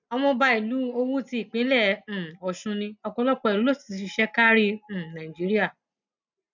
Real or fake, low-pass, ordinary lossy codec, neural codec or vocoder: real; 7.2 kHz; none; none